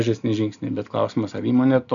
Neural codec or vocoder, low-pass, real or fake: none; 7.2 kHz; real